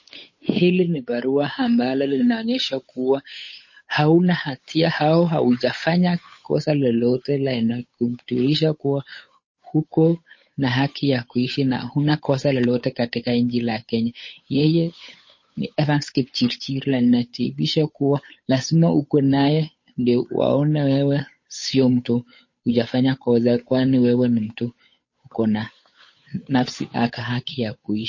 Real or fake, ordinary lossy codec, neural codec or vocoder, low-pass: fake; MP3, 32 kbps; codec, 16 kHz, 8 kbps, FunCodec, trained on Chinese and English, 25 frames a second; 7.2 kHz